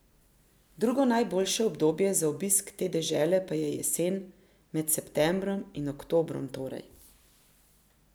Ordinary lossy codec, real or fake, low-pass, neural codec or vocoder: none; real; none; none